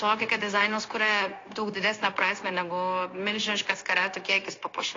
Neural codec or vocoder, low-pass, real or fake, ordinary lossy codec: codec, 16 kHz, 0.9 kbps, LongCat-Audio-Codec; 7.2 kHz; fake; AAC, 32 kbps